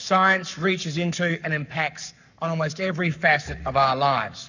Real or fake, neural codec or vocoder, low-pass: fake; codec, 44.1 kHz, 7.8 kbps, Pupu-Codec; 7.2 kHz